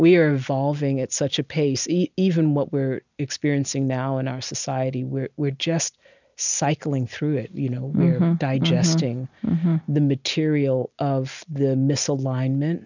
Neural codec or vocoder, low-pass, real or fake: none; 7.2 kHz; real